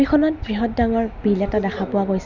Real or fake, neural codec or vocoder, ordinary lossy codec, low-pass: real; none; none; 7.2 kHz